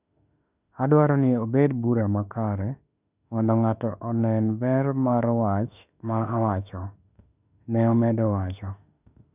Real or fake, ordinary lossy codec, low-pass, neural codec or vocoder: fake; none; 3.6 kHz; autoencoder, 48 kHz, 32 numbers a frame, DAC-VAE, trained on Japanese speech